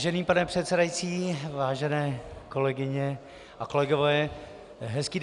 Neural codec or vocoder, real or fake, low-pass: none; real; 10.8 kHz